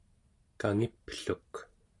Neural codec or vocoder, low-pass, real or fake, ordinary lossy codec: none; 10.8 kHz; real; AAC, 48 kbps